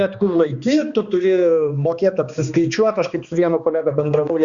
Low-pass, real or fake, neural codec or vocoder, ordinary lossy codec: 7.2 kHz; fake; codec, 16 kHz, 2 kbps, X-Codec, HuBERT features, trained on balanced general audio; Opus, 64 kbps